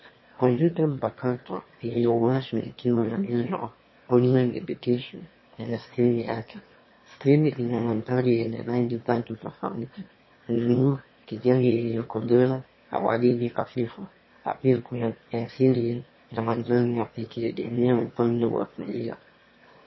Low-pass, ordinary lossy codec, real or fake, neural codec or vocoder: 7.2 kHz; MP3, 24 kbps; fake; autoencoder, 22.05 kHz, a latent of 192 numbers a frame, VITS, trained on one speaker